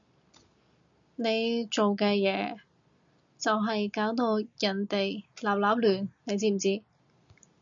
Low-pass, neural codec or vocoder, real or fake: 7.2 kHz; none; real